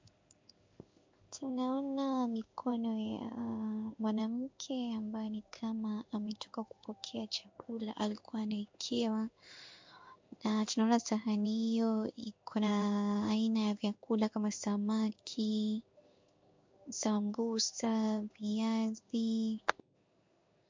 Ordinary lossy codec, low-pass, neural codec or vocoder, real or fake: MP3, 48 kbps; 7.2 kHz; codec, 16 kHz in and 24 kHz out, 1 kbps, XY-Tokenizer; fake